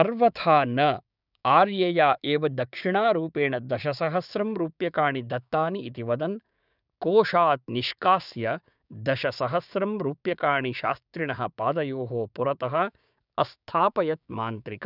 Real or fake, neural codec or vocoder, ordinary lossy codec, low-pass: fake; codec, 16 kHz, 6 kbps, DAC; none; 5.4 kHz